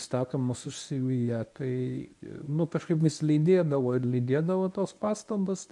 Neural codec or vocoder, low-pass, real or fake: codec, 24 kHz, 0.9 kbps, WavTokenizer, medium speech release version 1; 10.8 kHz; fake